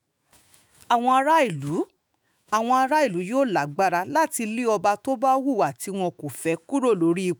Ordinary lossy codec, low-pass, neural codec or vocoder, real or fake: none; none; autoencoder, 48 kHz, 128 numbers a frame, DAC-VAE, trained on Japanese speech; fake